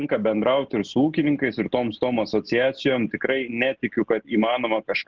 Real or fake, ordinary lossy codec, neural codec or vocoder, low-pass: real; Opus, 16 kbps; none; 7.2 kHz